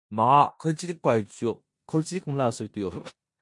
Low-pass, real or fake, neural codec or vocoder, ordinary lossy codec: 10.8 kHz; fake; codec, 16 kHz in and 24 kHz out, 0.9 kbps, LongCat-Audio-Codec, four codebook decoder; MP3, 64 kbps